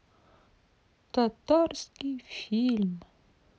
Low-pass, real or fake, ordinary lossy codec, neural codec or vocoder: none; real; none; none